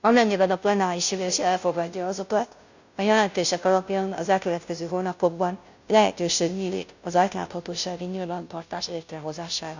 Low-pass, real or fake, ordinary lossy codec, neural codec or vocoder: 7.2 kHz; fake; none; codec, 16 kHz, 0.5 kbps, FunCodec, trained on Chinese and English, 25 frames a second